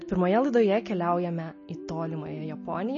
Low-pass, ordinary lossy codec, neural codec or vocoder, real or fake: 7.2 kHz; MP3, 32 kbps; none; real